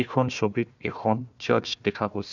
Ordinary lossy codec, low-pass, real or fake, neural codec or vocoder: none; 7.2 kHz; fake; codec, 16 kHz, 1 kbps, FunCodec, trained on Chinese and English, 50 frames a second